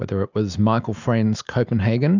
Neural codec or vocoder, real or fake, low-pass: none; real; 7.2 kHz